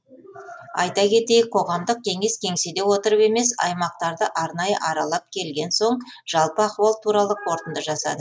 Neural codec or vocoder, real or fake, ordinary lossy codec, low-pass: none; real; none; none